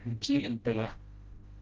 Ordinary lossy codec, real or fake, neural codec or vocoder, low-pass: Opus, 16 kbps; fake; codec, 16 kHz, 0.5 kbps, FreqCodec, smaller model; 7.2 kHz